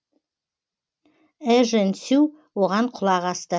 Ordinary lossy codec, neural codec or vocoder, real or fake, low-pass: none; none; real; none